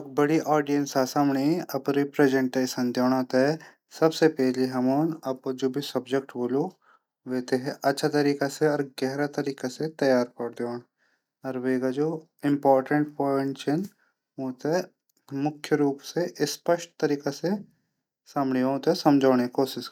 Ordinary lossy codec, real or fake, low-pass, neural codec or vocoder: none; real; 19.8 kHz; none